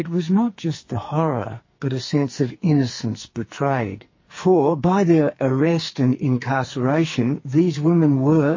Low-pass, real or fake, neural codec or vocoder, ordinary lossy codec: 7.2 kHz; fake; codec, 44.1 kHz, 2.6 kbps, SNAC; MP3, 32 kbps